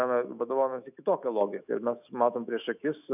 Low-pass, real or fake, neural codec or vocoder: 3.6 kHz; fake; autoencoder, 48 kHz, 128 numbers a frame, DAC-VAE, trained on Japanese speech